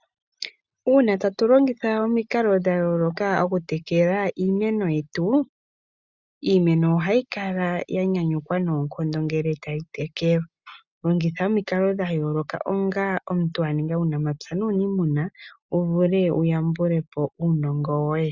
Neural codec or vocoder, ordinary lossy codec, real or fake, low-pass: none; Opus, 64 kbps; real; 7.2 kHz